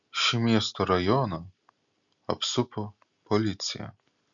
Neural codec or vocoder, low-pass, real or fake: none; 7.2 kHz; real